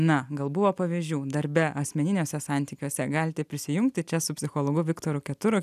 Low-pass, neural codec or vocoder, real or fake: 14.4 kHz; none; real